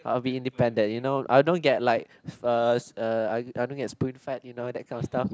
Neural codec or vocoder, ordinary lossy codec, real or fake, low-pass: none; none; real; none